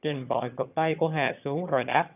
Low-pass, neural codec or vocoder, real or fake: 3.6 kHz; vocoder, 22.05 kHz, 80 mel bands, HiFi-GAN; fake